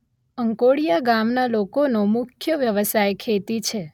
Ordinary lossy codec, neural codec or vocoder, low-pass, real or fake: none; none; 19.8 kHz; real